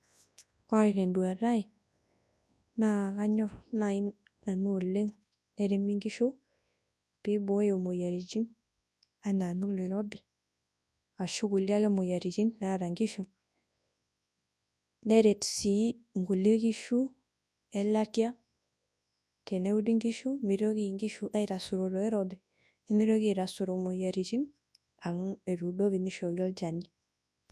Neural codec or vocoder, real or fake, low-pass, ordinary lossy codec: codec, 24 kHz, 0.9 kbps, WavTokenizer, large speech release; fake; none; none